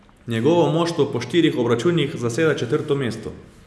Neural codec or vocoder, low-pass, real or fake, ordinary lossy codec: none; none; real; none